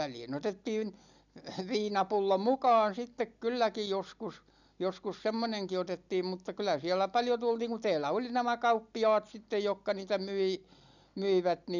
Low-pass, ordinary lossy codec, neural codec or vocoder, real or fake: 7.2 kHz; none; none; real